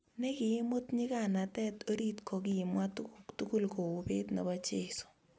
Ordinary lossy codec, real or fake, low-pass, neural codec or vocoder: none; real; none; none